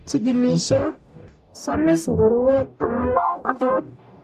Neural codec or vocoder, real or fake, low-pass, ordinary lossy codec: codec, 44.1 kHz, 0.9 kbps, DAC; fake; 14.4 kHz; MP3, 96 kbps